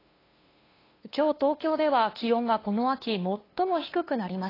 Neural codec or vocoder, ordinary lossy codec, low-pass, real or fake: codec, 16 kHz, 2 kbps, FunCodec, trained on LibriTTS, 25 frames a second; AAC, 24 kbps; 5.4 kHz; fake